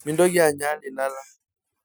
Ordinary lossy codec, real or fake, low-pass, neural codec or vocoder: none; real; none; none